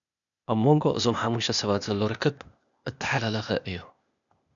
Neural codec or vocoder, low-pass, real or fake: codec, 16 kHz, 0.8 kbps, ZipCodec; 7.2 kHz; fake